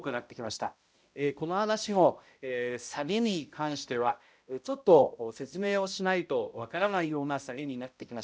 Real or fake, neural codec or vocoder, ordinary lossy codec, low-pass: fake; codec, 16 kHz, 0.5 kbps, X-Codec, HuBERT features, trained on balanced general audio; none; none